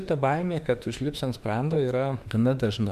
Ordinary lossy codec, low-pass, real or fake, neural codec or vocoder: Opus, 64 kbps; 14.4 kHz; fake; autoencoder, 48 kHz, 32 numbers a frame, DAC-VAE, trained on Japanese speech